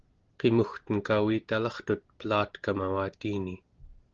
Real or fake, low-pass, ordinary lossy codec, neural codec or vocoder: real; 7.2 kHz; Opus, 16 kbps; none